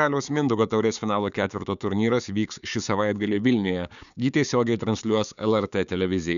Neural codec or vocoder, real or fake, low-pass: codec, 16 kHz, 6 kbps, DAC; fake; 7.2 kHz